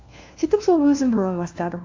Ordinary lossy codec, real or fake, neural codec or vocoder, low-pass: AAC, 48 kbps; fake; codec, 16 kHz, 1 kbps, FunCodec, trained on LibriTTS, 50 frames a second; 7.2 kHz